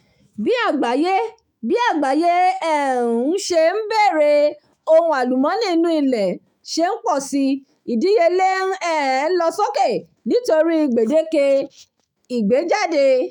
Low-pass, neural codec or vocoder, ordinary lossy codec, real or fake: 19.8 kHz; autoencoder, 48 kHz, 128 numbers a frame, DAC-VAE, trained on Japanese speech; none; fake